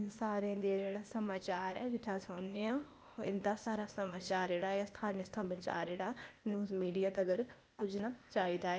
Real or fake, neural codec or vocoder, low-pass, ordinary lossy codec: fake; codec, 16 kHz, 0.8 kbps, ZipCodec; none; none